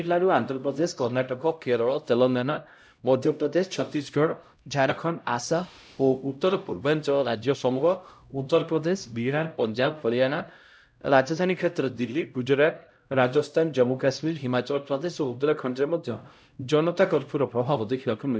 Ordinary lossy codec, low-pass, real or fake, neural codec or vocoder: none; none; fake; codec, 16 kHz, 0.5 kbps, X-Codec, HuBERT features, trained on LibriSpeech